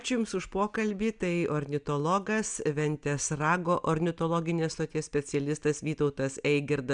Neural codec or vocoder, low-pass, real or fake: none; 9.9 kHz; real